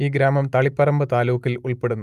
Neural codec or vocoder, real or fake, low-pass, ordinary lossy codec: none; real; 14.4 kHz; Opus, 32 kbps